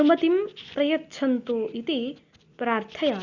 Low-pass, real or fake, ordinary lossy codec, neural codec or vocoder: 7.2 kHz; real; Opus, 64 kbps; none